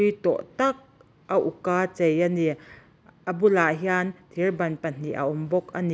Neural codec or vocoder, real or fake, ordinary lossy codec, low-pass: none; real; none; none